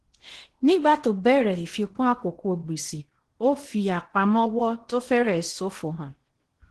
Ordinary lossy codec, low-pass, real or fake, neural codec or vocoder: Opus, 16 kbps; 10.8 kHz; fake; codec, 16 kHz in and 24 kHz out, 0.8 kbps, FocalCodec, streaming, 65536 codes